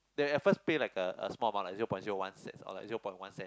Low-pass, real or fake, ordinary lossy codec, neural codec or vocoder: none; real; none; none